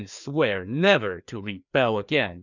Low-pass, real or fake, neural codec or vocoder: 7.2 kHz; fake; codec, 16 kHz, 2 kbps, FreqCodec, larger model